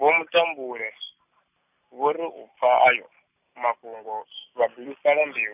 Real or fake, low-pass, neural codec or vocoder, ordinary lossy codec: real; 3.6 kHz; none; none